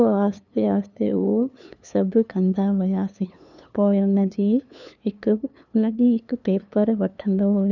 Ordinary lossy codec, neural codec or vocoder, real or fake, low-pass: none; codec, 16 kHz, 2 kbps, FunCodec, trained on LibriTTS, 25 frames a second; fake; 7.2 kHz